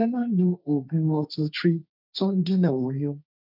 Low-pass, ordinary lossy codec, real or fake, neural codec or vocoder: 5.4 kHz; none; fake; codec, 16 kHz, 1.1 kbps, Voila-Tokenizer